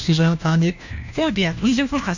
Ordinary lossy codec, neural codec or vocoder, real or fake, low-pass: none; codec, 16 kHz, 1 kbps, FunCodec, trained on LibriTTS, 50 frames a second; fake; 7.2 kHz